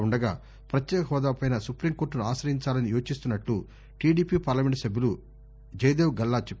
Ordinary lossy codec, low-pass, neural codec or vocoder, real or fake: none; none; none; real